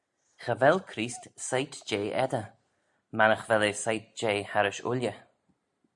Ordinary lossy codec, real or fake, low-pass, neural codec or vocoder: MP3, 64 kbps; real; 10.8 kHz; none